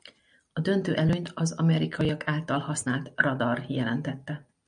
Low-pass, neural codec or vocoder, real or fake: 9.9 kHz; none; real